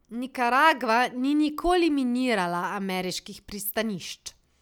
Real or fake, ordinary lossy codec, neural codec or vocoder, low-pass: real; none; none; 19.8 kHz